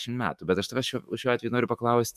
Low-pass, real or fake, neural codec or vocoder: 14.4 kHz; fake; autoencoder, 48 kHz, 128 numbers a frame, DAC-VAE, trained on Japanese speech